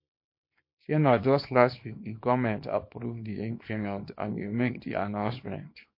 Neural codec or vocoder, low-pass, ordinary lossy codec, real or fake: codec, 24 kHz, 0.9 kbps, WavTokenizer, small release; 5.4 kHz; MP3, 32 kbps; fake